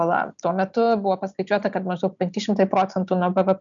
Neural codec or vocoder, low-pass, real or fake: none; 7.2 kHz; real